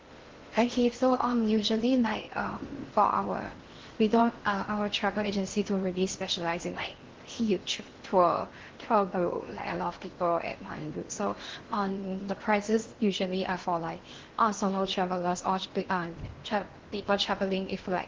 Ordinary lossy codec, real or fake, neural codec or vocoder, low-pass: Opus, 16 kbps; fake; codec, 16 kHz in and 24 kHz out, 0.6 kbps, FocalCodec, streaming, 4096 codes; 7.2 kHz